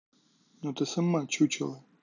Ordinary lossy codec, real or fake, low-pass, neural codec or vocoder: none; real; 7.2 kHz; none